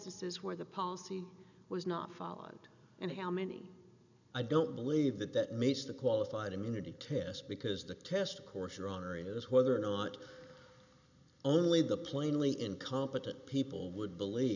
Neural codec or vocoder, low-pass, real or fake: none; 7.2 kHz; real